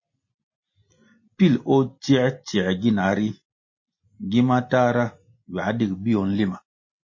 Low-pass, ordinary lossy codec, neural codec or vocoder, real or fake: 7.2 kHz; MP3, 32 kbps; none; real